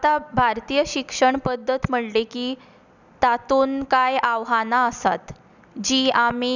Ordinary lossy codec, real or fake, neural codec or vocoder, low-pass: none; real; none; 7.2 kHz